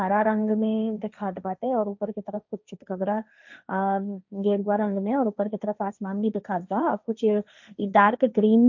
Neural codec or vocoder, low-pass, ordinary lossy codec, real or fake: codec, 16 kHz, 1.1 kbps, Voila-Tokenizer; 7.2 kHz; none; fake